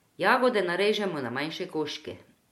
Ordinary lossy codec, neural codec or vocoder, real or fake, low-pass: MP3, 64 kbps; none; real; 19.8 kHz